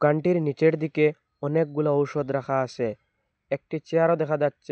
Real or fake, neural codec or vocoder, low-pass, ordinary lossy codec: real; none; none; none